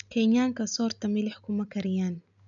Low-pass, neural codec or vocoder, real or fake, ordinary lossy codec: 7.2 kHz; none; real; none